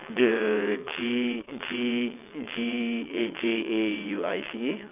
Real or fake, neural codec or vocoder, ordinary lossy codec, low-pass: fake; vocoder, 22.05 kHz, 80 mel bands, WaveNeXt; none; 3.6 kHz